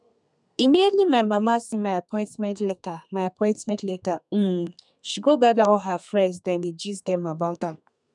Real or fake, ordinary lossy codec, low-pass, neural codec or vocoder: fake; none; 10.8 kHz; codec, 32 kHz, 1.9 kbps, SNAC